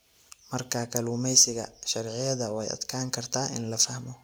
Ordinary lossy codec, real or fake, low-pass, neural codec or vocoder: none; real; none; none